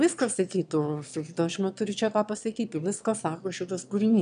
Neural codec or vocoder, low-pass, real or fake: autoencoder, 22.05 kHz, a latent of 192 numbers a frame, VITS, trained on one speaker; 9.9 kHz; fake